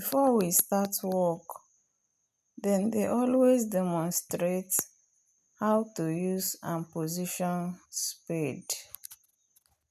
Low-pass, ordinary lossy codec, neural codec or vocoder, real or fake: 14.4 kHz; none; none; real